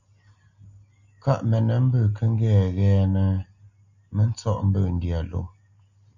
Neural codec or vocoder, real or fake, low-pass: none; real; 7.2 kHz